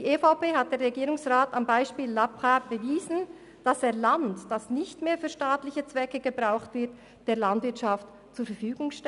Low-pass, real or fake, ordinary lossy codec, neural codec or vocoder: 10.8 kHz; real; none; none